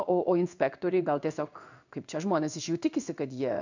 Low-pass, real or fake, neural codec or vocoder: 7.2 kHz; fake; codec, 16 kHz in and 24 kHz out, 1 kbps, XY-Tokenizer